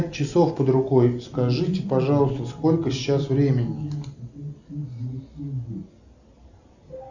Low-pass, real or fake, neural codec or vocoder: 7.2 kHz; real; none